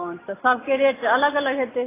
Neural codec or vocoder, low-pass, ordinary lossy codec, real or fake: none; 3.6 kHz; AAC, 16 kbps; real